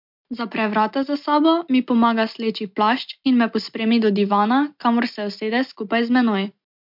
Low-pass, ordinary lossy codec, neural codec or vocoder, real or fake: 5.4 kHz; MP3, 48 kbps; none; real